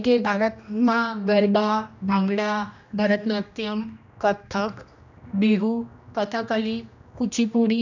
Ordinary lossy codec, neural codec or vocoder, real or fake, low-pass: none; codec, 16 kHz, 1 kbps, X-Codec, HuBERT features, trained on general audio; fake; 7.2 kHz